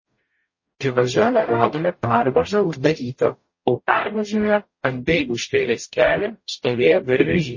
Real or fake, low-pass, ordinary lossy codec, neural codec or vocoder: fake; 7.2 kHz; MP3, 32 kbps; codec, 44.1 kHz, 0.9 kbps, DAC